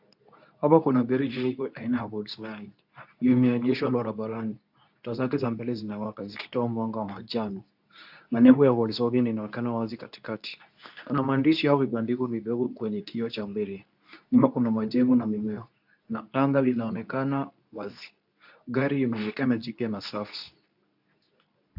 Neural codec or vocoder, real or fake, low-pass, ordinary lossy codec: codec, 24 kHz, 0.9 kbps, WavTokenizer, medium speech release version 2; fake; 5.4 kHz; AAC, 48 kbps